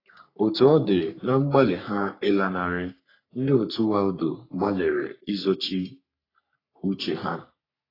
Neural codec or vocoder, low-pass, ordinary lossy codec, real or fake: codec, 44.1 kHz, 3.4 kbps, Pupu-Codec; 5.4 kHz; AAC, 24 kbps; fake